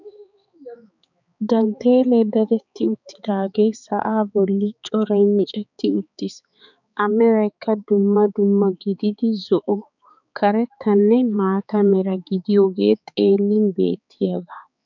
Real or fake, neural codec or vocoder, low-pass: fake; codec, 16 kHz, 4 kbps, X-Codec, HuBERT features, trained on balanced general audio; 7.2 kHz